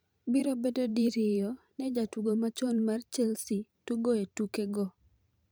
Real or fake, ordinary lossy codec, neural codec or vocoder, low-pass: fake; none; vocoder, 44.1 kHz, 128 mel bands every 512 samples, BigVGAN v2; none